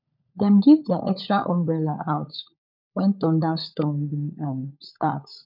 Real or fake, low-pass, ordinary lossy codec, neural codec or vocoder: fake; 5.4 kHz; none; codec, 16 kHz, 16 kbps, FunCodec, trained on LibriTTS, 50 frames a second